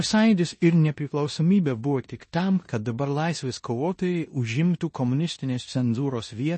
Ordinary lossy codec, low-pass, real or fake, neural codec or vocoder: MP3, 32 kbps; 10.8 kHz; fake; codec, 16 kHz in and 24 kHz out, 0.9 kbps, LongCat-Audio-Codec, four codebook decoder